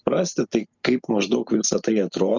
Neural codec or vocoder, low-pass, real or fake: none; 7.2 kHz; real